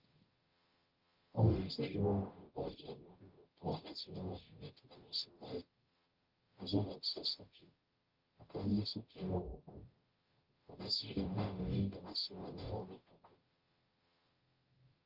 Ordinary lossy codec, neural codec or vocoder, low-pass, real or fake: Opus, 32 kbps; codec, 44.1 kHz, 0.9 kbps, DAC; 5.4 kHz; fake